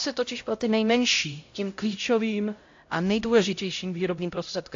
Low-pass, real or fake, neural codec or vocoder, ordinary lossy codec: 7.2 kHz; fake; codec, 16 kHz, 0.5 kbps, X-Codec, HuBERT features, trained on LibriSpeech; AAC, 48 kbps